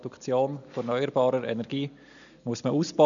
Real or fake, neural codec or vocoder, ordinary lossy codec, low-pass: real; none; none; 7.2 kHz